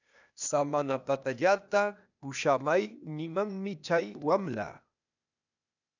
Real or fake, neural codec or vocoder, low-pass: fake; codec, 16 kHz, 0.8 kbps, ZipCodec; 7.2 kHz